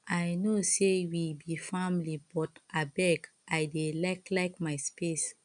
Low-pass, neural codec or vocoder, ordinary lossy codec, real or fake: 9.9 kHz; none; none; real